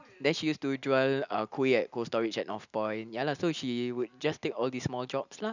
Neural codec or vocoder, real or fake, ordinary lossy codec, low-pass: none; real; none; 7.2 kHz